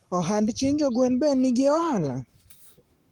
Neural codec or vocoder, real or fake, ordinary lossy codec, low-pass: codec, 44.1 kHz, 7.8 kbps, Pupu-Codec; fake; Opus, 24 kbps; 19.8 kHz